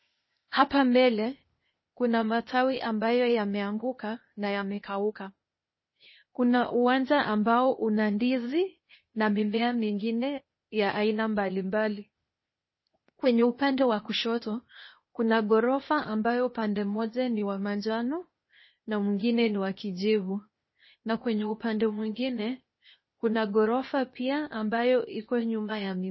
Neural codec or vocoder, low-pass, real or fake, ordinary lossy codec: codec, 16 kHz, 0.8 kbps, ZipCodec; 7.2 kHz; fake; MP3, 24 kbps